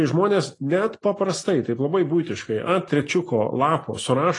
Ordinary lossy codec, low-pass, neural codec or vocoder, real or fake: AAC, 32 kbps; 10.8 kHz; none; real